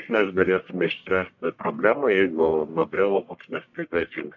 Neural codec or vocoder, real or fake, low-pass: codec, 44.1 kHz, 1.7 kbps, Pupu-Codec; fake; 7.2 kHz